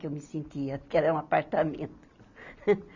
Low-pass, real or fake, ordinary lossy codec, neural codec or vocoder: 7.2 kHz; real; none; none